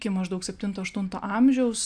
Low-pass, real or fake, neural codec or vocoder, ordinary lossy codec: 9.9 kHz; real; none; AAC, 64 kbps